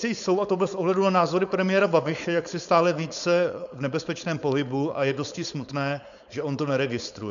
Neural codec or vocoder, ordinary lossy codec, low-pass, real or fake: codec, 16 kHz, 4.8 kbps, FACodec; MP3, 96 kbps; 7.2 kHz; fake